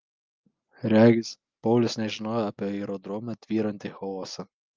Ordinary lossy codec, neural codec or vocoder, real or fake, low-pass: Opus, 32 kbps; none; real; 7.2 kHz